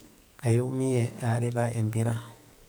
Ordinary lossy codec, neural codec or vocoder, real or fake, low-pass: none; codec, 44.1 kHz, 2.6 kbps, SNAC; fake; none